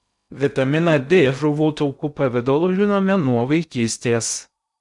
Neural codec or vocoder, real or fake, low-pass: codec, 16 kHz in and 24 kHz out, 0.6 kbps, FocalCodec, streaming, 2048 codes; fake; 10.8 kHz